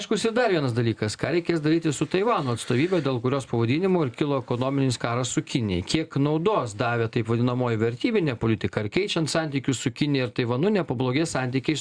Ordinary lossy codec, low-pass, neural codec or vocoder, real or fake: Opus, 64 kbps; 9.9 kHz; none; real